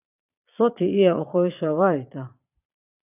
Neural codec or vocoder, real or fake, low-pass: vocoder, 22.05 kHz, 80 mel bands, Vocos; fake; 3.6 kHz